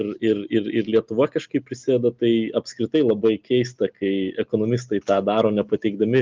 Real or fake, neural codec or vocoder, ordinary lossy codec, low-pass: real; none; Opus, 24 kbps; 7.2 kHz